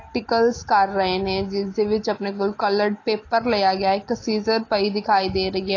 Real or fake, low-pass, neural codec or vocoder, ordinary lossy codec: real; 7.2 kHz; none; AAC, 32 kbps